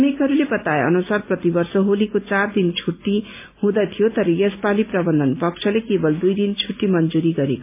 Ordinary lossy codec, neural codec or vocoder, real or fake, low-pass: MP3, 32 kbps; none; real; 3.6 kHz